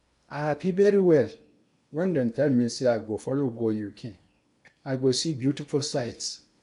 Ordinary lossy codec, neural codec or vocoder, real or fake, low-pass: none; codec, 16 kHz in and 24 kHz out, 0.8 kbps, FocalCodec, streaming, 65536 codes; fake; 10.8 kHz